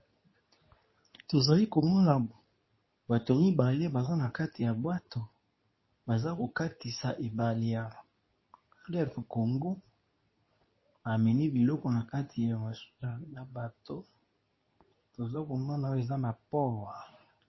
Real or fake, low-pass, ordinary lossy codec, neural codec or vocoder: fake; 7.2 kHz; MP3, 24 kbps; codec, 24 kHz, 0.9 kbps, WavTokenizer, medium speech release version 2